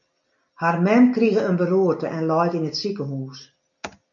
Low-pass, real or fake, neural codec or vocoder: 7.2 kHz; real; none